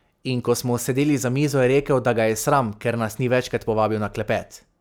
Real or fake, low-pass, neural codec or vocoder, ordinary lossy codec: real; none; none; none